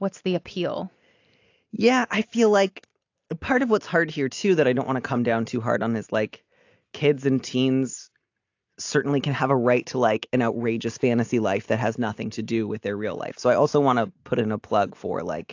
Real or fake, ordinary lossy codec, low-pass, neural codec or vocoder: real; AAC, 48 kbps; 7.2 kHz; none